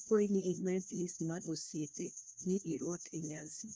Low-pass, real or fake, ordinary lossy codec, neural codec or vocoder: none; fake; none; codec, 16 kHz, 1 kbps, FunCodec, trained on LibriTTS, 50 frames a second